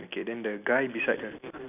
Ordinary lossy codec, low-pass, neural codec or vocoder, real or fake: none; 3.6 kHz; none; real